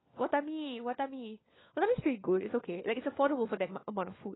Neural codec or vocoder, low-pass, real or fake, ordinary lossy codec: codec, 16 kHz, 4 kbps, FunCodec, trained on LibriTTS, 50 frames a second; 7.2 kHz; fake; AAC, 16 kbps